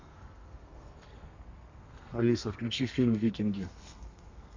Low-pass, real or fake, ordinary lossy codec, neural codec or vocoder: 7.2 kHz; fake; MP3, 48 kbps; codec, 32 kHz, 1.9 kbps, SNAC